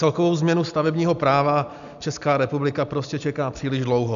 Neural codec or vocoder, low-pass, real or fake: none; 7.2 kHz; real